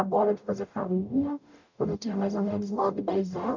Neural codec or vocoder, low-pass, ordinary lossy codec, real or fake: codec, 44.1 kHz, 0.9 kbps, DAC; 7.2 kHz; none; fake